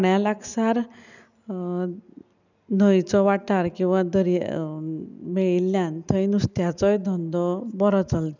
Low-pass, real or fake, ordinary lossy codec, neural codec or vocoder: 7.2 kHz; real; none; none